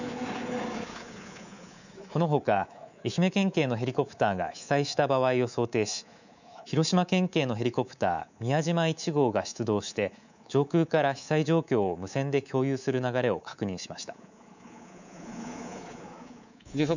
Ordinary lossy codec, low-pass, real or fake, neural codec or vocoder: none; 7.2 kHz; fake; codec, 24 kHz, 3.1 kbps, DualCodec